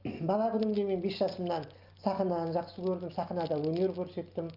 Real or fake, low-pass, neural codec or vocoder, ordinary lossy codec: real; 5.4 kHz; none; Opus, 24 kbps